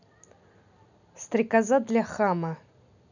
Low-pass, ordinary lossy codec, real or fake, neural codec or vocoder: 7.2 kHz; none; real; none